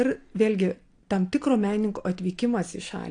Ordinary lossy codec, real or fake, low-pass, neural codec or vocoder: AAC, 48 kbps; real; 9.9 kHz; none